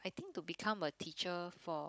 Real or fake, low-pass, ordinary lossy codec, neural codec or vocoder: fake; none; none; codec, 16 kHz, 16 kbps, FunCodec, trained on Chinese and English, 50 frames a second